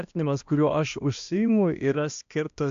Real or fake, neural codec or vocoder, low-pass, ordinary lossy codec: fake; codec, 16 kHz, 1 kbps, X-Codec, HuBERT features, trained on balanced general audio; 7.2 kHz; AAC, 64 kbps